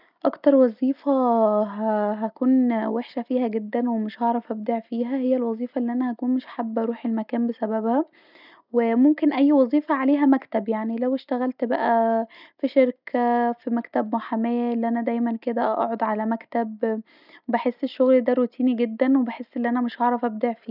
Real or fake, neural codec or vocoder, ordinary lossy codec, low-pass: real; none; none; 5.4 kHz